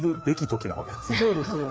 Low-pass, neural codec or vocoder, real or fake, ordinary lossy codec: none; codec, 16 kHz, 4 kbps, FreqCodec, larger model; fake; none